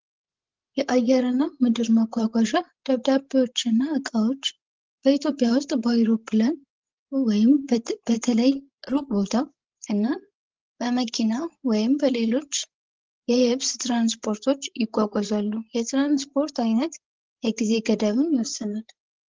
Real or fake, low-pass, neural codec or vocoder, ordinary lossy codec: fake; 7.2 kHz; codec, 16 kHz, 16 kbps, FreqCodec, larger model; Opus, 16 kbps